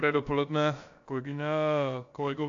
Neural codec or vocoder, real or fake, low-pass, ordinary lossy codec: codec, 16 kHz, about 1 kbps, DyCAST, with the encoder's durations; fake; 7.2 kHz; AAC, 48 kbps